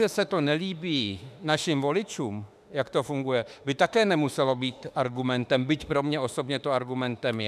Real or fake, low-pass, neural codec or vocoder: fake; 14.4 kHz; autoencoder, 48 kHz, 32 numbers a frame, DAC-VAE, trained on Japanese speech